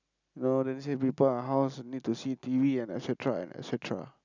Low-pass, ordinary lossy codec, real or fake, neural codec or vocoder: 7.2 kHz; none; real; none